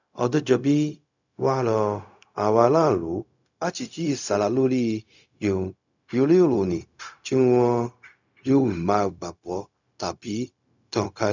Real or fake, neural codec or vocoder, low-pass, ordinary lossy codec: fake; codec, 16 kHz, 0.4 kbps, LongCat-Audio-Codec; 7.2 kHz; none